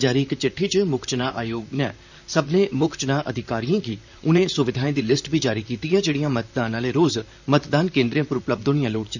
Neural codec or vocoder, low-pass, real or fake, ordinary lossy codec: vocoder, 44.1 kHz, 128 mel bands, Pupu-Vocoder; 7.2 kHz; fake; none